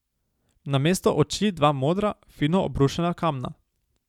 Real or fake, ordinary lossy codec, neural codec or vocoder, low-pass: real; none; none; 19.8 kHz